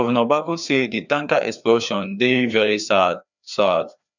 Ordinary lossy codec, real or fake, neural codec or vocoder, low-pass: none; fake; codec, 16 kHz, 2 kbps, FreqCodec, larger model; 7.2 kHz